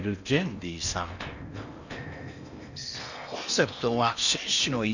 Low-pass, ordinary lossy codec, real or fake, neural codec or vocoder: 7.2 kHz; none; fake; codec, 16 kHz in and 24 kHz out, 0.8 kbps, FocalCodec, streaming, 65536 codes